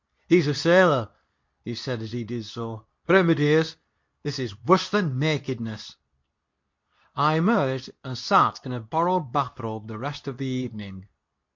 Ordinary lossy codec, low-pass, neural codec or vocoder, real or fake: MP3, 48 kbps; 7.2 kHz; codec, 24 kHz, 0.9 kbps, WavTokenizer, medium speech release version 2; fake